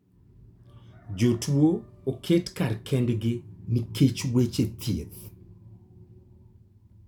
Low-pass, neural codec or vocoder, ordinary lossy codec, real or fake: 19.8 kHz; none; none; real